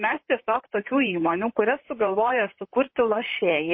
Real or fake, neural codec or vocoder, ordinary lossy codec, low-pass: fake; vocoder, 44.1 kHz, 128 mel bands, Pupu-Vocoder; MP3, 24 kbps; 7.2 kHz